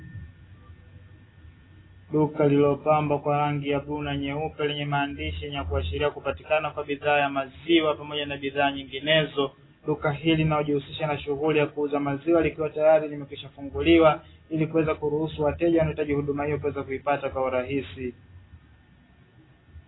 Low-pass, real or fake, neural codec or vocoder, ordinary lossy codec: 7.2 kHz; real; none; AAC, 16 kbps